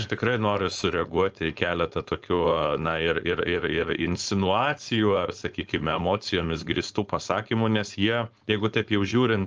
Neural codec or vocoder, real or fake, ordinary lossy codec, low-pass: codec, 16 kHz, 4.8 kbps, FACodec; fake; Opus, 24 kbps; 7.2 kHz